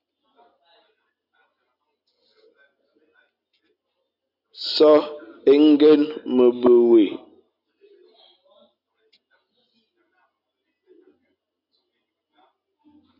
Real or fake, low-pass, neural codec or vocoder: real; 5.4 kHz; none